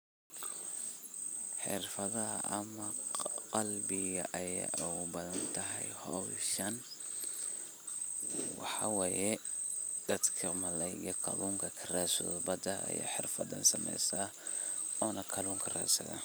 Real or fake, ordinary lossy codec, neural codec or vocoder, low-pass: real; none; none; none